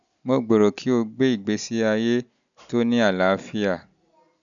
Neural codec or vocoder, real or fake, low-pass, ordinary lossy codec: none; real; 7.2 kHz; none